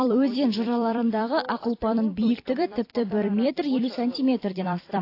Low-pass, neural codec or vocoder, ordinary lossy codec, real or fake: 5.4 kHz; vocoder, 44.1 kHz, 128 mel bands every 256 samples, BigVGAN v2; AAC, 32 kbps; fake